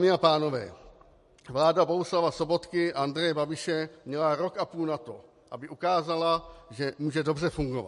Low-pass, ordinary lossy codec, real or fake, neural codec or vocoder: 14.4 kHz; MP3, 48 kbps; real; none